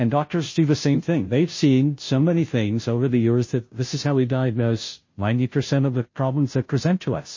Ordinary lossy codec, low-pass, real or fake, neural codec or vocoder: MP3, 32 kbps; 7.2 kHz; fake; codec, 16 kHz, 0.5 kbps, FunCodec, trained on Chinese and English, 25 frames a second